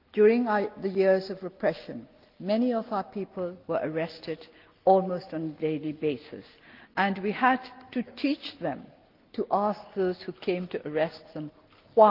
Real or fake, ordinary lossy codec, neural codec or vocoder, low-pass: real; Opus, 32 kbps; none; 5.4 kHz